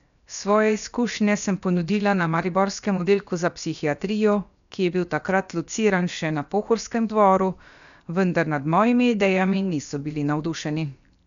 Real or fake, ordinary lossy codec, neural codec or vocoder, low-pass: fake; none; codec, 16 kHz, 0.7 kbps, FocalCodec; 7.2 kHz